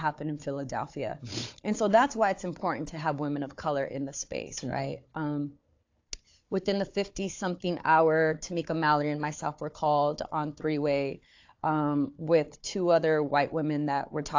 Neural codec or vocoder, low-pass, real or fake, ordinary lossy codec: codec, 16 kHz, 4 kbps, FunCodec, trained on Chinese and English, 50 frames a second; 7.2 kHz; fake; AAC, 48 kbps